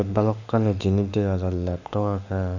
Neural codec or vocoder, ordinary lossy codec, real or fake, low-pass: autoencoder, 48 kHz, 32 numbers a frame, DAC-VAE, trained on Japanese speech; none; fake; 7.2 kHz